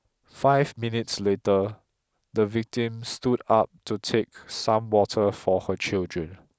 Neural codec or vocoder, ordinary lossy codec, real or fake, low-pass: none; none; real; none